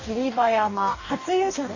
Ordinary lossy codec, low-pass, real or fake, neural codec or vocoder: none; 7.2 kHz; fake; codec, 44.1 kHz, 2.6 kbps, DAC